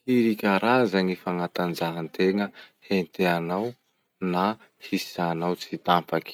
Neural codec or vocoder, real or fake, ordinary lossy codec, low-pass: none; real; none; 19.8 kHz